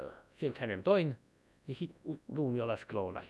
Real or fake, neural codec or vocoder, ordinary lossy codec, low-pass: fake; codec, 24 kHz, 0.9 kbps, WavTokenizer, large speech release; none; none